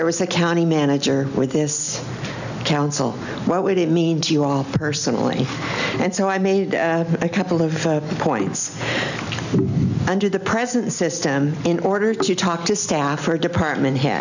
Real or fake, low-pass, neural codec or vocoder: real; 7.2 kHz; none